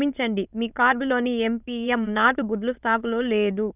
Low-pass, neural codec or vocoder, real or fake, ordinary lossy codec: 3.6 kHz; autoencoder, 22.05 kHz, a latent of 192 numbers a frame, VITS, trained on many speakers; fake; none